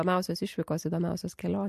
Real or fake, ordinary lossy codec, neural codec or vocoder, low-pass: real; MP3, 64 kbps; none; 14.4 kHz